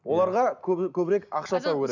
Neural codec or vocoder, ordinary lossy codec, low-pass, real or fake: none; none; 7.2 kHz; real